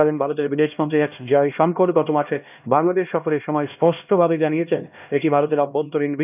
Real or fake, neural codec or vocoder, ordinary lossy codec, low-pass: fake; codec, 16 kHz, 1 kbps, X-Codec, HuBERT features, trained on LibriSpeech; none; 3.6 kHz